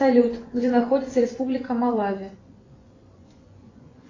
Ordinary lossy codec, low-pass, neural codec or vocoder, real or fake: AAC, 32 kbps; 7.2 kHz; vocoder, 24 kHz, 100 mel bands, Vocos; fake